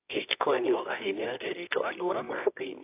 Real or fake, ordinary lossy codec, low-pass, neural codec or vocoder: fake; AAC, 24 kbps; 3.6 kHz; codec, 24 kHz, 0.9 kbps, WavTokenizer, medium music audio release